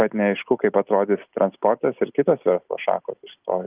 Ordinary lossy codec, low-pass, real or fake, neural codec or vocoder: Opus, 24 kbps; 3.6 kHz; real; none